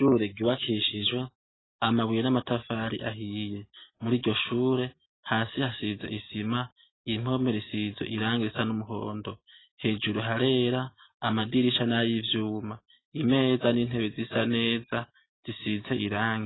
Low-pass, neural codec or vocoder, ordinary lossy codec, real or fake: 7.2 kHz; none; AAC, 16 kbps; real